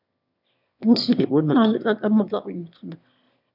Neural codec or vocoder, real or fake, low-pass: autoencoder, 22.05 kHz, a latent of 192 numbers a frame, VITS, trained on one speaker; fake; 5.4 kHz